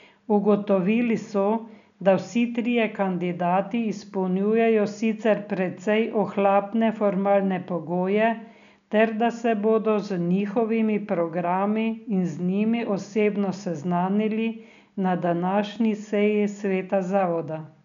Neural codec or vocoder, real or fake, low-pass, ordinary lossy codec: none; real; 7.2 kHz; none